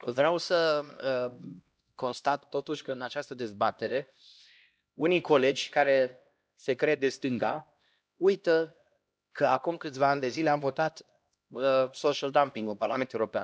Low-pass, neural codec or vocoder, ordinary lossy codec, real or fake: none; codec, 16 kHz, 1 kbps, X-Codec, HuBERT features, trained on LibriSpeech; none; fake